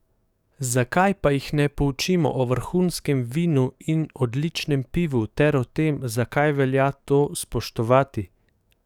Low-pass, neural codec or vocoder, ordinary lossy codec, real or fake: 19.8 kHz; codec, 44.1 kHz, 7.8 kbps, DAC; none; fake